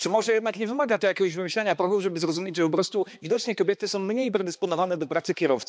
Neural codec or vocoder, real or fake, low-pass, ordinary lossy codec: codec, 16 kHz, 2 kbps, X-Codec, HuBERT features, trained on balanced general audio; fake; none; none